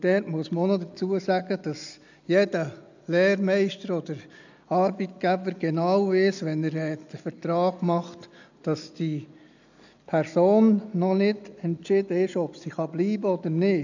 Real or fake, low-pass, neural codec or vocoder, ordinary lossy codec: real; 7.2 kHz; none; none